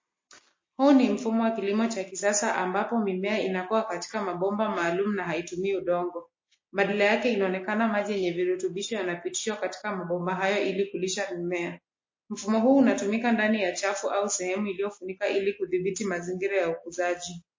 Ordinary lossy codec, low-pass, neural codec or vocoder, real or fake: MP3, 32 kbps; 7.2 kHz; none; real